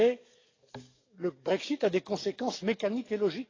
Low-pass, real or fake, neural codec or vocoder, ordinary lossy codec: 7.2 kHz; fake; codec, 44.1 kHz, 7.8 kbps, DAC; AAC, 32 kbps